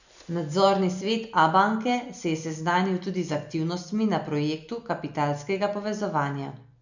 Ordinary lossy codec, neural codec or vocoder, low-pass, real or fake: none; none; 7.2 kHz; real